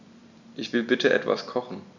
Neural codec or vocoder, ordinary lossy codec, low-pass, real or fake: none; none; 7.2 kHz; real